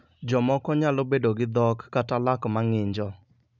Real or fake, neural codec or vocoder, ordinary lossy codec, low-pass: real; none; none; 7.2 kHz